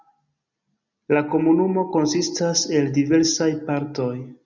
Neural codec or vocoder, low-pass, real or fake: none; 7.2 kHz; real